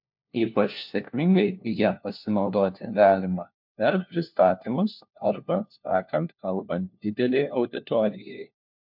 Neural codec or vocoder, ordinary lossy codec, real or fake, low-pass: codec, 16 kHz, 1 kbps, FunCodec, trained on LibriTTS, 50 frames a second; MP3, 48 kbps; fake; 5.4 kHz